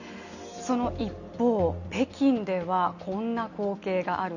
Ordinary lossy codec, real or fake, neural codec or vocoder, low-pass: none; real; none; 7.2 kHz